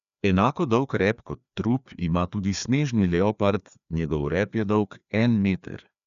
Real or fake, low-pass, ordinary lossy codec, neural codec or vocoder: fake; 7.2 kHz; none; codec, 16 kHz, 2 kbps, FreqCodec, larger model